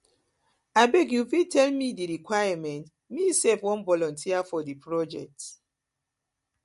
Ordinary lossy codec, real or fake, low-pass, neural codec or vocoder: MP3, 48 kbps; real; 14.4 kHz; none